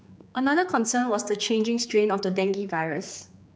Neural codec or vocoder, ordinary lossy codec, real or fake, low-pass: codec, 16 kHz, 4 kbps, X-Codec, HuBERT features, trained on general audio; none; fake; none